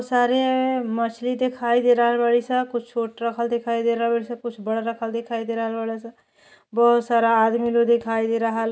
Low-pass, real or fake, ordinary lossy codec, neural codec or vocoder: none; real; none; none